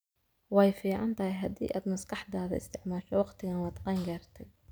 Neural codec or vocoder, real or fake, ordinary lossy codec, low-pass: none; real; none; none